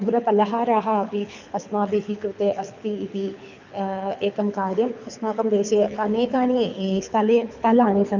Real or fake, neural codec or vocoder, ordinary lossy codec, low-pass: fake; codec, 24 kHz, 6 kbps, HILCodec; none; 7.2 kHz